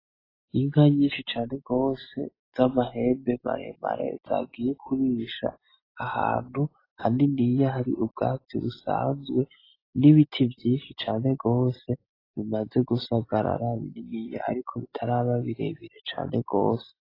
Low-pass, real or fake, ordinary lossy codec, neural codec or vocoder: 5.4 kHz; real; AAC, 24 kbps; none